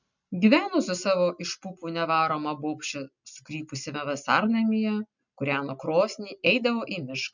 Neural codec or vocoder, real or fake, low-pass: none; real; 7.2 kHz